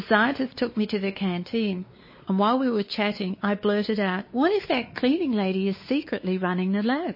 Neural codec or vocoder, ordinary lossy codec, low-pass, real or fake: codec, 16 kHz, 4 kbps, X-Codec, WavLM features, trained on Multilingual LibriSpeech; MP3, 24 kbps; 5.4 kHz; fake